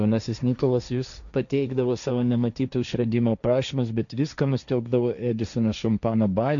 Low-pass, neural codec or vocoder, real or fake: 7.2 kHz; codec, 16 kHz, 1.1 kbps, Voila-Tokenizer; fake